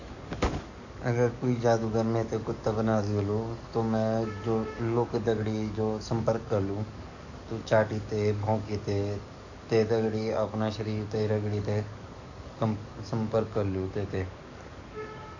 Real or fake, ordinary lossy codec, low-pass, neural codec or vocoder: fake; none; 7.2 kHz; codec, 44.1 kHz, 7.8 kbps, DAC